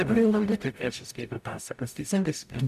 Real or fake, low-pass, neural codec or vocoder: fake; 14.4 kHz; codec, 44.1 kHz, 0.9 kbps, DAC